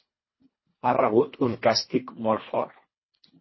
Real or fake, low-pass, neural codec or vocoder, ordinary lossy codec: fake; 7.2 kHz; codec, 24 kHz, 1.5 kbps, HILCodec; MP3, 24 kbps